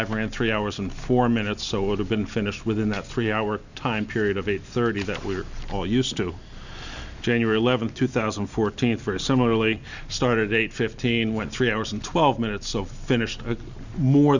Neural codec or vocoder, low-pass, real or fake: none; 7.2 kHz; real